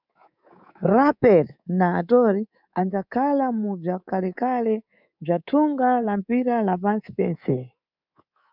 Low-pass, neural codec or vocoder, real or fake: 5.4 kHz; codec, 24 kHz, 3.1 kbps, DualCodec; fake